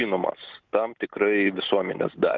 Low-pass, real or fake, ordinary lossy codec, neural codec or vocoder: 7.2 kHz; real; Opus, 24 kbps; none